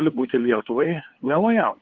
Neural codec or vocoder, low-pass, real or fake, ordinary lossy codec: codec, 16 kHz, 2 kbps, FunCodec, trained on LibriTTS, 25 frames a second; 7.2 kHz; fake; Opus, 16 kbps